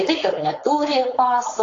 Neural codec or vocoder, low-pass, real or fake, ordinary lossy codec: codec, 16 kHz, 4.8 kbps, FACodec; 7.2 kHz; fake; AAC, 32 kbps